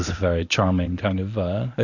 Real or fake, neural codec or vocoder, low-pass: fake; codec, 24 kHz, 0.9 kbps, WavTokenizer, medium speech release version 1; 7.2 kHz